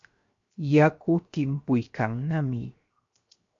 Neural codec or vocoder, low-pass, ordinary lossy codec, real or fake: codec, 16 kHz, 0.7 kbps, FocalCodec; 7.2 kHz; MP3, 48 kbps; fake